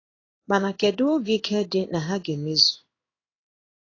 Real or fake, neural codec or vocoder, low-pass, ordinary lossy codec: fake; codec, 16 kHz in and 24 kHz out, 1 kbps, XY-Tokenizer; 7.2 kHz; AAC, 32 kbps